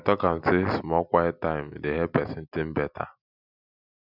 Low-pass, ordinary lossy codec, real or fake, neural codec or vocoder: 5.4 kHz; none; real; none